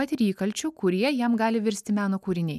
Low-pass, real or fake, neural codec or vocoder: 14.4 kHz; real; none